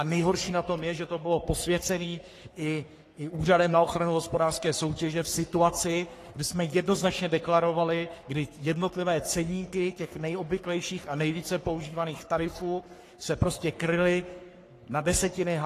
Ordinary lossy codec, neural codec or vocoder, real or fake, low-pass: AAC, 48 kbps; codec, 44.1 kHz, 3.4 kbps, Pupu-Codec; fake; 14.4 kHz